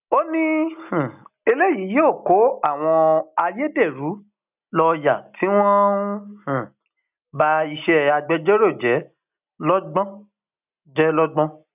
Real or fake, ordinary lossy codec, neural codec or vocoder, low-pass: real; none; none; 3.6 kHz